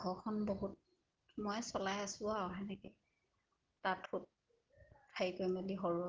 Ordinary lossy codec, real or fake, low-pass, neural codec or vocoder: Opus, 16 kbps; real; 7.2 kHz; none